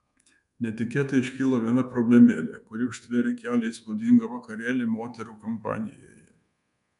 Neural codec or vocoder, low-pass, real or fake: codec, 24 kHz, 1.2 kbps, DualCodec; 10.8 kHz; fake